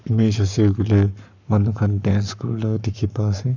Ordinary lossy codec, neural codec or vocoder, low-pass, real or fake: none; codec, 44.1 kHz, 7.8 kbps, Pupu-Codec; 7.2 kHz; fake